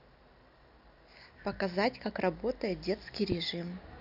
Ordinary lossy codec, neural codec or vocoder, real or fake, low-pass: none; none; real; 5.4 kHz